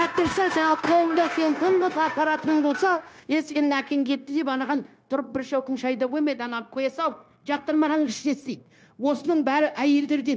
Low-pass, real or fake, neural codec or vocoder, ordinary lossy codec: none; fake; codec, 16 kHz, 0.9 kbps, LongCat-Audio-Codec; none